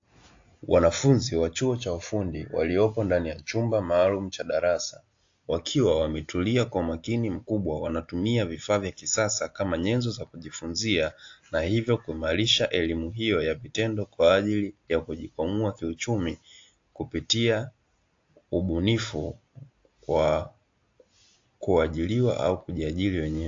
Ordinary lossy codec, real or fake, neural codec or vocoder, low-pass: AAC, 48 kbps; real; none; 7.2 kHz